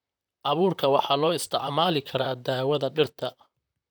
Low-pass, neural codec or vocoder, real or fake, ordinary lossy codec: none; vocoder, 44.1 kHz, 128 mel bands, Pupu-Vocoder; fake; none